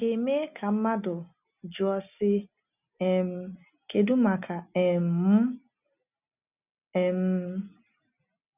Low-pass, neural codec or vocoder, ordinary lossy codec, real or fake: 3.6 kHz; none; none; real